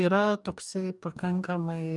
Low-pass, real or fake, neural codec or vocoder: 10.8 kHz; fake; codec, 44.1 kHz, 2.6 kbps, DAC